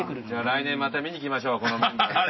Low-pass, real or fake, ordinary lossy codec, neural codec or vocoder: 7.2 kHz; real; MP3, 24 kbps; none